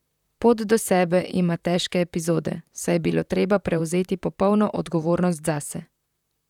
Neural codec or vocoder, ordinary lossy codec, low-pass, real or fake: vocoder, 44.1 kHz, 128 mel bands, Pupu-Vocoder; none; 19.8 kHz; fake